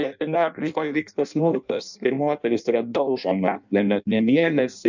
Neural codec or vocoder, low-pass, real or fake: codec, 16 kHz in and 24 kHz out, 0.6 kbps, FireRedTTS-2 codec; 7.2 kHz; fake